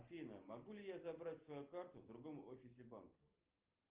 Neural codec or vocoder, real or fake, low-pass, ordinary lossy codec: none; real; 3.6 kHz; Opus, 32 kbps